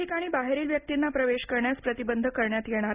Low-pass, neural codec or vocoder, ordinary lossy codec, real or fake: 3.6 kHz; none; Opus, 64 kbps; real